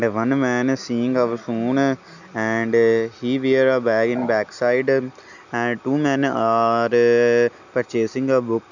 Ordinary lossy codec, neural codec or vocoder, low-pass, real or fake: none; none; 7.2 kHz; real